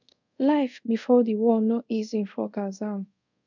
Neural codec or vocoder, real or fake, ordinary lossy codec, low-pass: codec, 24 kHz, 0.5 kbps, DualCodec; fake; none; 7.2 kHz